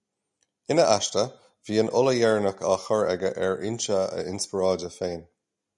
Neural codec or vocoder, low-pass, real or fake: none; 10.8 kHz; real